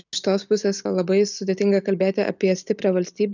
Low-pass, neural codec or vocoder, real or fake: 7.2 kHz; none; real